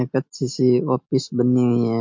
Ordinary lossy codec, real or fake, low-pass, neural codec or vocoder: MP3, 48 kbps; real; 7.2 kHz; none